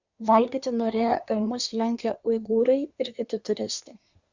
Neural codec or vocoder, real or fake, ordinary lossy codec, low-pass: codec, 24 kHz, 1 kbps, SNAC; fake; Opus, 64 kbps; 7.2 kHz